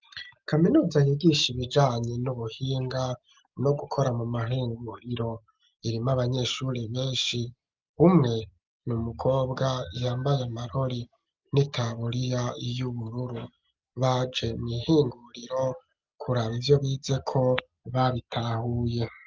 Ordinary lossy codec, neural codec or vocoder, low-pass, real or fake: Opus, 24 kbps; none; 7.2 kHz; real